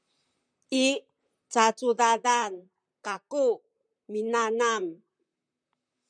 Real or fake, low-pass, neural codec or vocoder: fake; 9.9 kHz; vocoder, 44.1 kHz, 128 mel bands, Pupu-Vocoder